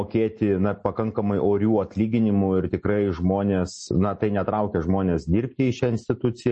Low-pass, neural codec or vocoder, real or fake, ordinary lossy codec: 9.9 kHz; none; real; MP3, 32 kbps